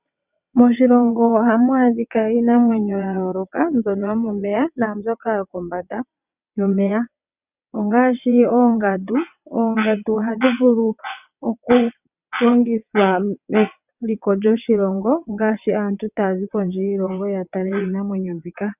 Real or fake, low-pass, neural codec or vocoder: fake; 3.6 kHz; vocoder, 22.05 kHz, 80 mel bands, WaveNeXt